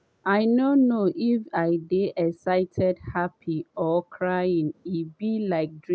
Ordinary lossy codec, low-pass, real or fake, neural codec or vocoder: none; none; real; none